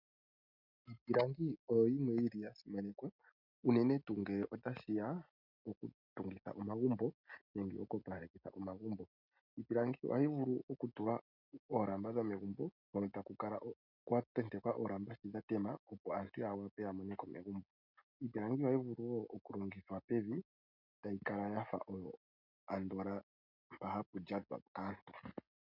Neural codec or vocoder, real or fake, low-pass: none; real; 5.4 kHz